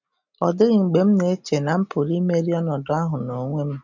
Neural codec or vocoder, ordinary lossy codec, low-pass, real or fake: none; none; 7.2 kHz; real